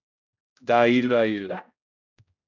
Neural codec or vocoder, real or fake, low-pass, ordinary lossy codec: codec, 16 kHz, 0.5 kbps, X-Codec, HuBERT features, trained on general audio; fake; 7.2 kHz; MP3, 64 kbps